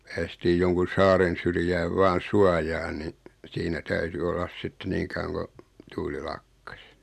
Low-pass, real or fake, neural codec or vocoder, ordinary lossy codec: 14.4 kHz; real; none; none